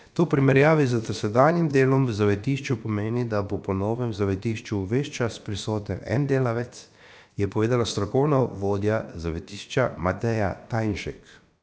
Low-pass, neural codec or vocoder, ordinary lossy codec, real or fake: none; codec, 16 kHz, about 1 kbps, DyCAST, with the encoder's durations; none; fake